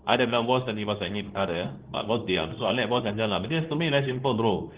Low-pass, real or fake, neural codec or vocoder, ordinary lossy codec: 3.6 kHz; fake; codec, 24 kHz, 0.9 kbps, WavTokenizer, medium speech release version 2; Opus, 16 kbps